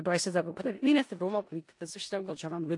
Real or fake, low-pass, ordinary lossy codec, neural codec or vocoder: fake; 10.8 kHz; AAC, 48 kbps; codec, 16 kHz in and 24 kHz out, 0.4 kbps, LongCat-Audio-Codec, four codebook decoder